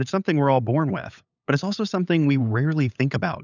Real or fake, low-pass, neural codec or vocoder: fake; 7.2 kHz; codec, 16 kHz, 8 kbps, FunCodec, trained on LibriTTS, 25 frames a second